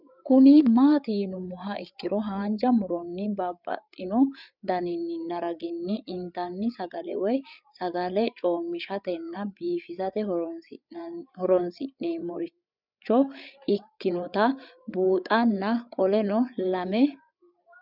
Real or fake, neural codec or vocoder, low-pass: fake; codec, 16 kHz, 8 kbps, FreqCodec, larger model; 5.4 kHz